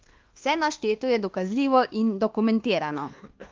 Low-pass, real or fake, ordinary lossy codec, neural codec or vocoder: 7.2 kHz; fake; Opus, 16 kbps; codec, 24 kHz, 1.2 kbps, DualCodec